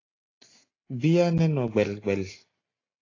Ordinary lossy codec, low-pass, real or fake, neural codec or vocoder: AAC, 32 kbps; 7.2 kHz; real; none